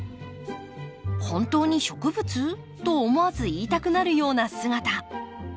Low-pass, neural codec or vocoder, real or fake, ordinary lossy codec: none; none; real; none